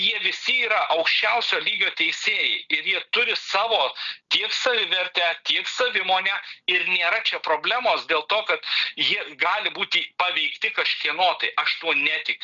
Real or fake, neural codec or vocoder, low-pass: real; none; 7.2 kHz